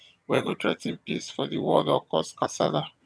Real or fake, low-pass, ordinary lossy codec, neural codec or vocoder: fake; none; none; vocoder, 22.05 kHz, 80 mel bands, HiFi-GAN